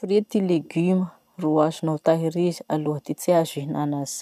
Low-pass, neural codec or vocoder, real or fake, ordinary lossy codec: 14.4 kHz; none; real; none